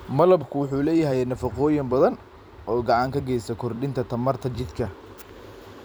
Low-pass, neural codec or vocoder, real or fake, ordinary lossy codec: none; vocoder, 44.1 kHz, 128 mel bands every 256 samples, BigVGAN v2; fake; none